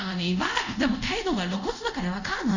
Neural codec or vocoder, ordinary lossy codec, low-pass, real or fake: codec, 24 kHz, 0.5 kbps, DualCodec; none; 7.2 kHz; fake